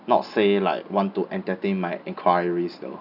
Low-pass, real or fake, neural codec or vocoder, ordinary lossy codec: 5.4 kHz; real; none; none